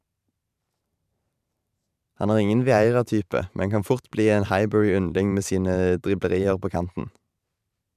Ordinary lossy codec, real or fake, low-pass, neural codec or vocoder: none; fake; 14.4 kHz; vocoder, 44.1 kHz, 128 mel bands every 512 samples, BigVGAN v2